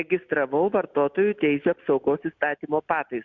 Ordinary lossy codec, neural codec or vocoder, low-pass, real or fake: MP3, 64 kbps; none; 7.2 kHz; real